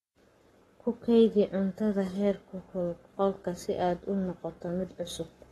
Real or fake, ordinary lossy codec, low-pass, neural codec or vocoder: fake; AAC, 32 kbps; 19.8 kHz; codec, 44.1 kHz, 7.8 kbps, Pupu-Codec